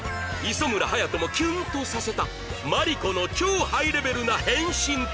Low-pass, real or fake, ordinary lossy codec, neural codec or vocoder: none; real; none; none